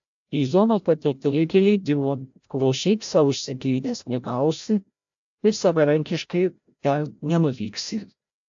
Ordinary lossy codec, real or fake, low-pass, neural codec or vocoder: AAC, 64 kbps; fake; 7.2 kHz; codec, 16 kHz, 0.5 kbps, FreqCodec, larger model